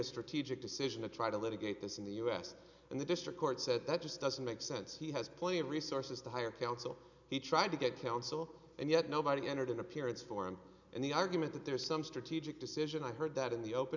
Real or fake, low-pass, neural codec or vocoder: real; 7.2 kHz; none